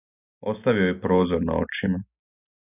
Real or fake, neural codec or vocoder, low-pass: real; none; 3.6 kHz